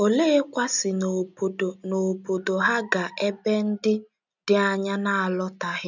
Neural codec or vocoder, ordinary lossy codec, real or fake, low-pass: none; none; real; 7.2 kHz